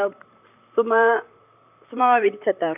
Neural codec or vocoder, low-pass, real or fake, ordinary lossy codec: vocoder, 44.1 kHz, 128 mel bands, Pupu-Vocoder; 3.6 kHz; fake; none